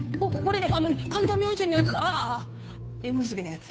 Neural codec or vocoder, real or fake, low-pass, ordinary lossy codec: codec, 16 kHz, 2 kbps, FunCodec, trained on Chinese and English, 25 frames a second; fake; none; none